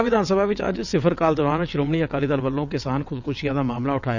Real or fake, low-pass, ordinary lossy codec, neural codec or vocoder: fake; 7.2 kHz; none; vocoder, 22.05 kHz, 80 mel bands, WaveNeXt